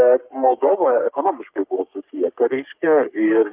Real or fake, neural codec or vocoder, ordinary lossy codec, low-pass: fake; codec, 44.1 kHz, 3.4 kbps, Pupu-Codec; Opus, 32 kbps; 3.6 kHz